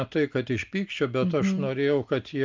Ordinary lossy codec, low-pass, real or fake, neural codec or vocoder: Opus, 24 kbps; 7.2 kHz; real; none